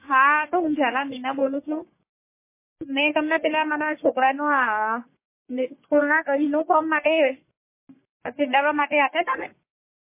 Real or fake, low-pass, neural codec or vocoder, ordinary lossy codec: fake; 3.6 kHz; codec, 44.1 kHz, 1.7 kbps, Pupu-Codec; MP3, 24 kbps